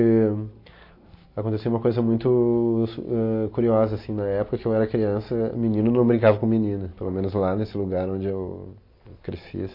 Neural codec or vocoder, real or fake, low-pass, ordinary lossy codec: none; real; 5.4 kHz; MP3, 32 kbps